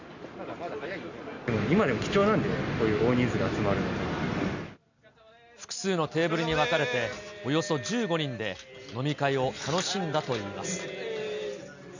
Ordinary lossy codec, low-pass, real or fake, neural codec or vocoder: none; 7.2 kHz; real; none